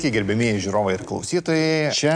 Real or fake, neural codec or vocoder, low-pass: real; none; 9.9 kHz